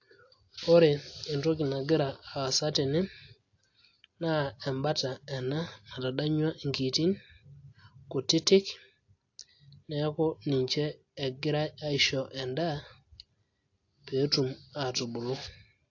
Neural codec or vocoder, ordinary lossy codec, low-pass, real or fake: none; AAC, 48 kbps; 7.2 kHz; real